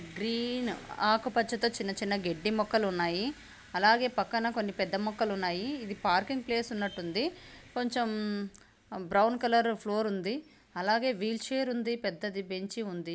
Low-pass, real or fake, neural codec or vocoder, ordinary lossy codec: none; real; none; none